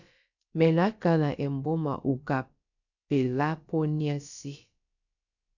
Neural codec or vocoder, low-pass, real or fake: codec, 16 kHz, about 1 kbps, DyCAST, with the encoder's durations; 7.2 kHz; fake